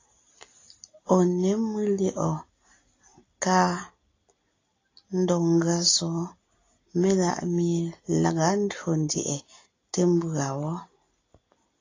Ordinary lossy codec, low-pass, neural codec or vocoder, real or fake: AAC, 32 kbps; 7.2 kHz; none; real